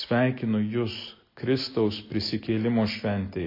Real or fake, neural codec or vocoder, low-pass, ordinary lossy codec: real; none; 5.4 kHz; MP3, 32 kbps